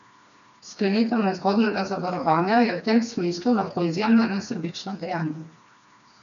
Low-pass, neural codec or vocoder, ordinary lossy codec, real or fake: 7.2 kHz; codec, 16 kHz, 2 kbps, FreqCodec, smaller model; MP3, 96 kbps; fake